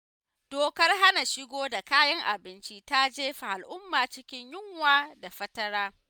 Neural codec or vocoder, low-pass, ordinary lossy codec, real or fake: none; none; none; real